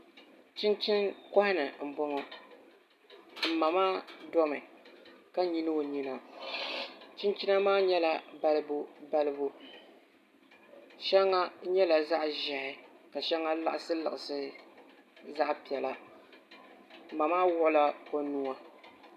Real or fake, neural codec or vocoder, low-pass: real; none; 14.4 kHz